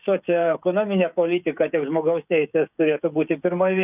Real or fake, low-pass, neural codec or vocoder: fake; 3.6 kHz; codec, 16 kHz, 16 kbps, FreqCodec, smaller model